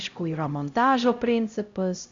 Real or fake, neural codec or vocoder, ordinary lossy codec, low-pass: fake; codec, 16 kHz, 0.5 kbps, X-Codec, HuBERT features, trained on LibriSpeech; Opus, 64 kbps; 7.2 kHz